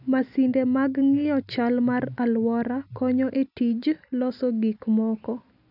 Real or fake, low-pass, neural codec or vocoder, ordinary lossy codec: real; 5.4 kHz; none; none